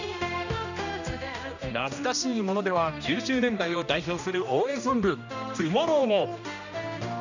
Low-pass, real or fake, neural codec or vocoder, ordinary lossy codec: 7.2 kHz; fake; codec, 16 kHz, 1 kbps, X-Codec, HuBERT features, trained on general audio; none